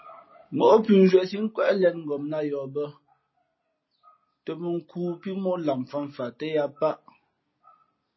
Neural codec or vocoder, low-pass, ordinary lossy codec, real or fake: none; 7.2 kHz; MP3, 24 kbps; real